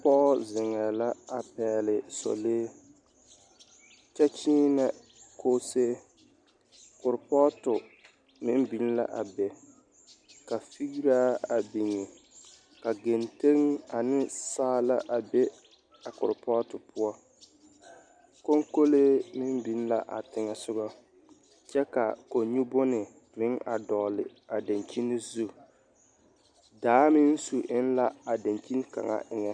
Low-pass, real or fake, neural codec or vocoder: 9.9 kHz; real; none